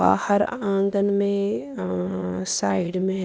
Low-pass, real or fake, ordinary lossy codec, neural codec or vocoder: none; fake; none; codec, 16 kHz, 0.8 kbps, ZipCodec